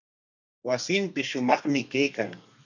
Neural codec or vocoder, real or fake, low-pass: codec, 32 kHz, 1.9 kbps, SNAC; fake; 7.2 kHz